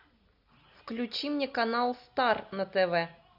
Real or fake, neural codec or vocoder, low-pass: real; none; 5.4 kHz